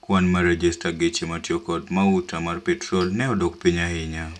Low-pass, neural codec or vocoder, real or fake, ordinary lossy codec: none; none; real; none